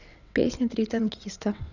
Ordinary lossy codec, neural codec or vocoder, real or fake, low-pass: none; vocoder, 22.05 kHz, 80 mel bands, WaveNeXt; fake; 7.2 kHz